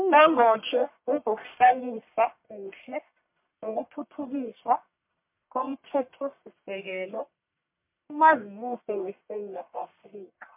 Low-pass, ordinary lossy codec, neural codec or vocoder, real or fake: 3.6 kHz; MP3, 32 kbps; codec, 44.1 kHz, 1.7 kbps, Pupu-Codec; fake